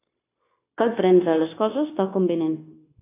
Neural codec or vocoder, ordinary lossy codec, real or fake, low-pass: codec, 16 kHz, 0.9 kbps, LongCat-Audio-Codec; AAC, 24 kbps; fake; 3.6 kHz